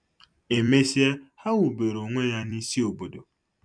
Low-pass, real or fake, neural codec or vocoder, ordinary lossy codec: 9.9 kHz; fake; vocoder, 44.1 kHz, 128 mel bands every 256 samples, BigVGAN v2; none